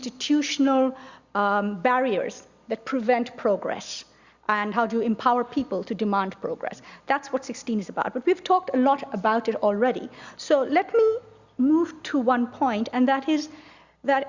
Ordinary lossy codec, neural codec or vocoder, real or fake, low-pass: Opus, 64 kbps; none; real; 7.2 kHz